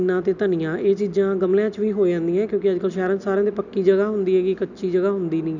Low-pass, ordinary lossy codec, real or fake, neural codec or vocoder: 7.2 kHz; none; real; none